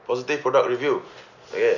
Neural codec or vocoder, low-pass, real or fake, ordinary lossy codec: none; 7.2 kHz; real; none